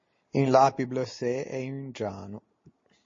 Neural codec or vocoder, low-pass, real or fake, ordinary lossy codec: none; 7.2 kHz; real; MP3, 32 kbps